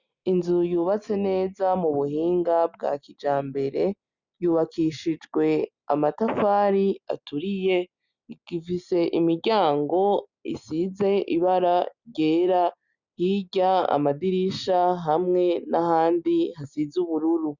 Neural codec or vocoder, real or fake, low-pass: none; real; 7.2 kHz